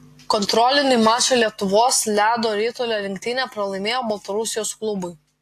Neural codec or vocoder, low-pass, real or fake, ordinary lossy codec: none; 14.4 kHz; real; AAC, 64 kbps